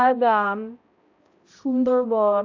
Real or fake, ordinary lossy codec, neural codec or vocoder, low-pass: fake; AAC, 48 kbps; codec, 16 kHz, 0.5 kbps, X-Codec, HuBERT features, trained on balanced general audio; 7.2 kHz